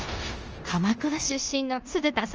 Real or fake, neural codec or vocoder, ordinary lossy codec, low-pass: fake; codec, 16 kHz in and 24 kHz out, 0.9 kbps, LongCat-Audio-Codec, four codebook decoder; Opus, 24 kbps; 7.2 kHz